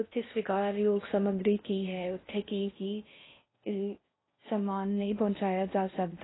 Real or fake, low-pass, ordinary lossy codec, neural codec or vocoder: fake; 7.2 kHz; AAC, 16 kbps; codec, 16 kHz in and 24 kHz out, 0.6 kbps, FocalCodec, streaming, 4096 codes